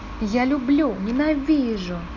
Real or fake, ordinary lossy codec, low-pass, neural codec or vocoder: real; none; 7.2 kHz; none